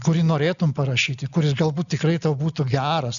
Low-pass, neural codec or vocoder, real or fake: 7.2 kHz; none; real